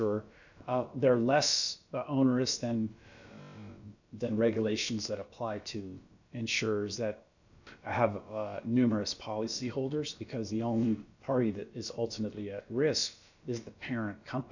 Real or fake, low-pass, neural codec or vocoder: fake; 7.2 kHz; codec, 16 kHz, about 1 kbps, DyCAST, with the encoder's durations